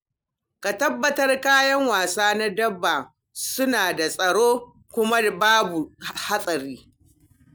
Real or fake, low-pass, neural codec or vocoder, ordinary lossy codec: real; none; none; none